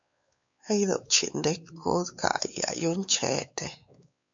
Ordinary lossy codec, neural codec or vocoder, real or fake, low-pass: MP3, 64 kbps; codec, 16 kHz, 4 kbps, X-Codec, WavLM features, trained on Multilingual LibriSpeech; fake; 7.2 kHz